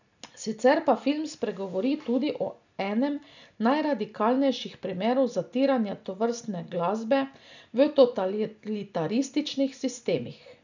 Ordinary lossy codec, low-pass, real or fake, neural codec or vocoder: none; 7.2 kHz; real; none